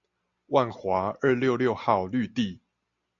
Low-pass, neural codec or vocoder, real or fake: 7.2 kHz; none; real